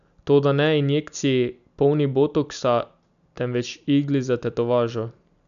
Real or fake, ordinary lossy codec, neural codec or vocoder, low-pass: real; none; none; 7.2 kHz